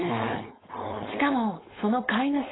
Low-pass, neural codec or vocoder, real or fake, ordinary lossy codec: 7.2 kHz; codec, 16 kHz, 4.8 kbps, FACodec; fake; AAC, 16 kbps